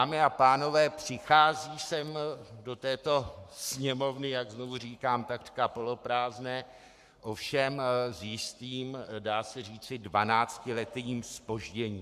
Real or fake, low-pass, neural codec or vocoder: fake; 14.4 kHz; codec, 44.1 kHz, 7.8 kbps, Pupu-Codec